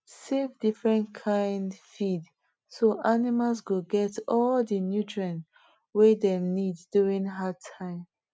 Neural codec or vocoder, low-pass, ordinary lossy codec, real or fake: none; none; none; real